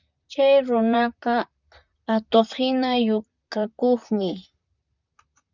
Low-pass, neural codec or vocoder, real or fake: 7.2 kHz; vocoder, 44.1 kHz, 128 mel bands, Pupu-Vocoder; fake